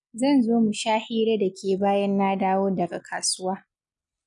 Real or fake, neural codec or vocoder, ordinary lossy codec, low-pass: real; none; none; 10.8 kHz